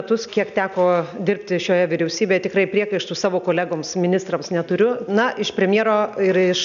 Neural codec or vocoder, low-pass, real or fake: none; 7.2 kHz; real